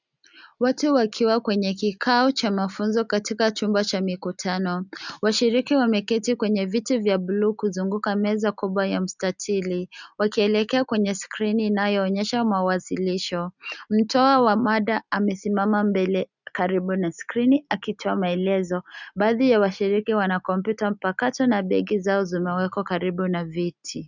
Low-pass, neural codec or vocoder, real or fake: 7.2 kHz; none; real